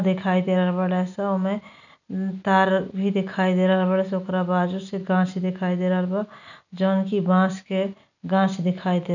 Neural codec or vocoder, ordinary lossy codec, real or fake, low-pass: none; none; real; 7.2 kHz